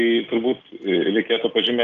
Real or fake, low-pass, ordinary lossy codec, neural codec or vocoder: real; 7.2 kHz; Opus, 32 kbps; none